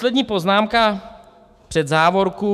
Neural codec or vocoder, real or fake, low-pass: autoencoder, 48 kHz, 128 numbers a frame, DAC-VAE, trained on Japanese speech; fake; 14.4 kHz